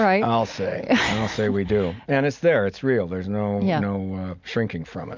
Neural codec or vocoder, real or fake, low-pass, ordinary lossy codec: none; real; 7.2 kHz; MP3, 64 kbps